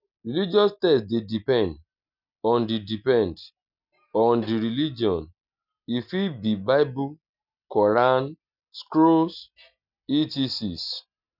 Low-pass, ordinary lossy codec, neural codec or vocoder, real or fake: 5.4 kHz; none; none; real